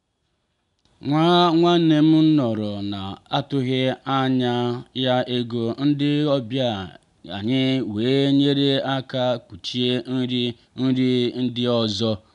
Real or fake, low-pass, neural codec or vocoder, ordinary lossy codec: real; 10.8 kHz; none; none